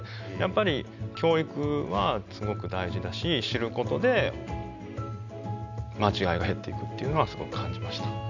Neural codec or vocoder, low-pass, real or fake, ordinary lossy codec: none; 7.2 kHz; real; none